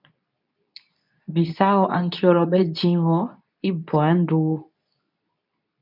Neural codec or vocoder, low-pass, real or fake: codec, 24 kHz, 0.9 kbps, WavTokenizer, medium speech release version 2; 5.4 kHz; fake